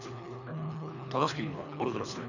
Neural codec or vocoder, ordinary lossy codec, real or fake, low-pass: codec, 24 kHz, 3 kbps, HILCodec; none; fake; 7.2 kHz